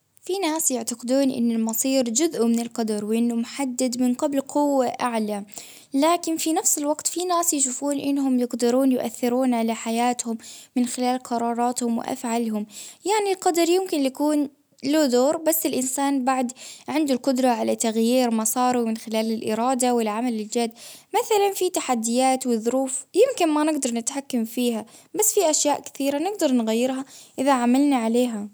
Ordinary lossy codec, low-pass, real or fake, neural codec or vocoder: none; none; real; none